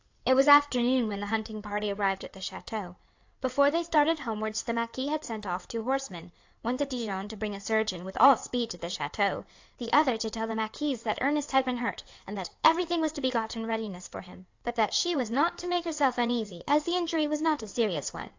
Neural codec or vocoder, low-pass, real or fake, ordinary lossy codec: codec, 16 kHz in and 24 kHz out, 2.2 kbps, FireRedTTS-2 codec; 7.2 kHz; fake; AAC, 48 kbps